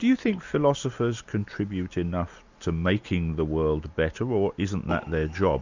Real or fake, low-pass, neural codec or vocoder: real; 7.2 kHz; none